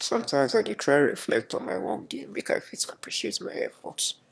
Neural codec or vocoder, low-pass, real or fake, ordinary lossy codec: autoencoder, 22.05 kHz, a latent of 192 numbers a frame, VITS, trained on one speaker; none; fake; none